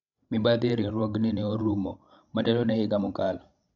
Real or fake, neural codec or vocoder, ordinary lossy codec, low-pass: fake; codec, 16 kHz, 16 kbps, FreqCodec, larger model; MP3, 96 kbps; 7.2 kHz